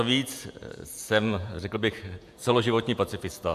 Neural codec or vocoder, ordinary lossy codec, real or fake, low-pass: vocoder, 48 kHz, 128 mel bands, Vocos; AAC, 96 kbps; fake; 14.4 kHz